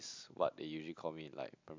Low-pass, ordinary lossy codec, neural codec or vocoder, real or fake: 7.2 kHz; MP3, 64 kbps; none; real